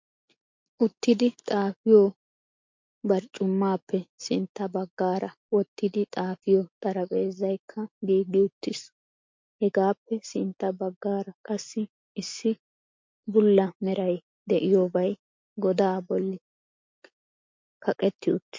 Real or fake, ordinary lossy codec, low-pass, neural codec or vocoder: real; MP3, 48 kbps; 7.2 kHz; none